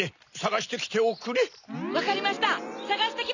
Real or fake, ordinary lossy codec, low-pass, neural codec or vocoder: real; none; 7.2 kHz; none